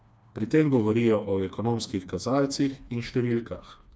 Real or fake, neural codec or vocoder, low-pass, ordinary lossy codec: fake; codec, 16 kHz, 2 kbps, FreqCodec, smaller model; none; none